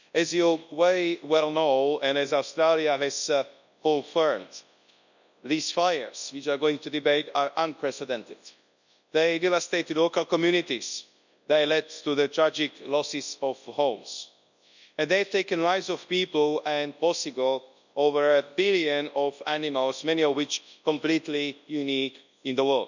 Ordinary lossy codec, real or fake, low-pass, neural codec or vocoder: none; fake; 7.2 kHz; codec, 24 kHz, 0.9 kbps, WavTokenizer, large speech release